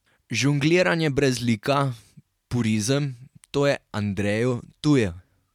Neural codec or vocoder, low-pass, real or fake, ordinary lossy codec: none; 19.8 kHz; real; MP3, 96 kbps